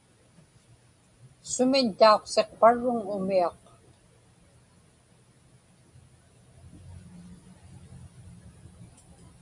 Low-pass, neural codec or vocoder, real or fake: 10.8 kHz; none; real